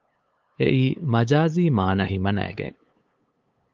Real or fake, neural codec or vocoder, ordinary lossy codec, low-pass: fake; codec, 16 kHz, 8 kbps, FunCodec, trained on LibriTTS, 25 frames a second; Opus, 24 kbps; 7.2 kHz